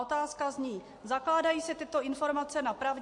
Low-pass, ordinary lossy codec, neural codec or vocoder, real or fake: 10.8 kHz; MP3, 48 kbps; none; real